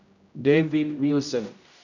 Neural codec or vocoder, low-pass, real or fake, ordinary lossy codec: codec, 16 kHz, 0.5 kbps, X-Codec, HuBERT features, trained on general audio; 7.2 kHz; fake; none